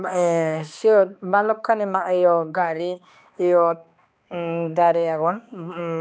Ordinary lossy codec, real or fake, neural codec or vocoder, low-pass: none; fake; codec, 16 kHz, 2 kbps, X-Codec, HuBERT features, trained on balanced general audio; none